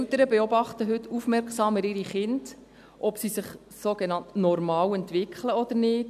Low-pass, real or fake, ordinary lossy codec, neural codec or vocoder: 14.4 kHz; real; none; none